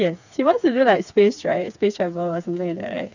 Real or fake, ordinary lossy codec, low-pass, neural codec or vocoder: fake; none; 7.2 kHz; codec, 16 kHz, 4 kbps, FreqCodec, smaller model